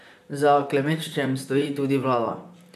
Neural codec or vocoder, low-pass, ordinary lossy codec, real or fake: vocoder, 44.1 kHz, 128 mel bands, Pupu-Vocoder; 14.4 kHz; none; fake